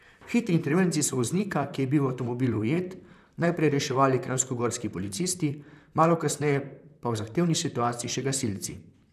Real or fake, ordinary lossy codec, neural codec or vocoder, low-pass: fake; none; vocoder, 44.1 kHz, 128 mel bands, Pupu-Vocoder; 14.4 kHz